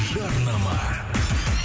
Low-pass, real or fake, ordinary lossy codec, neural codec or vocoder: none; real; none; none